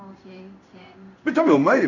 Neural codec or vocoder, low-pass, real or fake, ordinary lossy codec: codec, 16 kHz in and 24 kHz out, 1 kbps, XY-Tokenizer; 7.2 kHz; fake; none